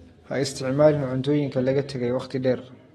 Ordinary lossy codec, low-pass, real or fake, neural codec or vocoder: AAC, 32 kbps; 19.8 kHz; real; none